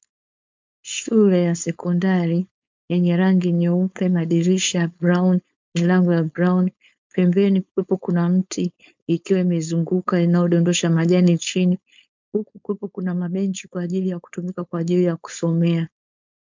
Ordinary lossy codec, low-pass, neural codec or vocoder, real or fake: MP3, 64 kbps; 7.2 kHz; codec, 16 kHz, 4.8 kbps, FACodec; fake